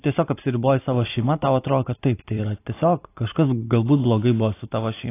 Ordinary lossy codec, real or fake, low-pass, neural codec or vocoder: AAC, 24 kbps; fake; 3.6 kHz; vocoder, 44.1 kHz, 128 mel bands every 256 samples, BigVGAN v2